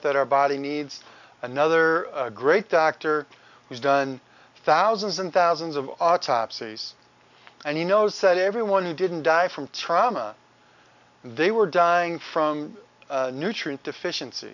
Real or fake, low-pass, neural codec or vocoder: real; 7.2 kHz; none